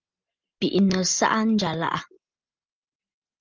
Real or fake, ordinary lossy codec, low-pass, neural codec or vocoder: real; Opus, 32 kbps; 7.2 kHz; none